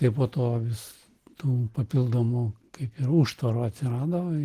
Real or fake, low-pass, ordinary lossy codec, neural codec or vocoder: real; 14.4 kHz; Opus, 16 kbps; none